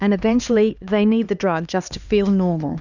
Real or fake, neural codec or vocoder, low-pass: fake; codec, 16 kHz, 2 kbps, X-Codec, HuBERT features, trained on LibriSpeech; 7.2 kHz